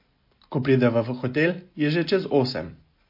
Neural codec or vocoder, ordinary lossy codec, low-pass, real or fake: none; MP3, 32 kbps; 5.4 kHz; real